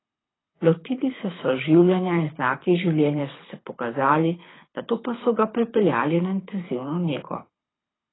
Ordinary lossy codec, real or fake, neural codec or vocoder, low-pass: AAC, 16 kbps; fake; codec, 24 kHz, 6 kbps, HILCodec; 7.2 kHz